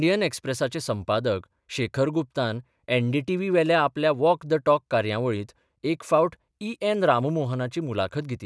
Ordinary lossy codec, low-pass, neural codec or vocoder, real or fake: none; none; none; real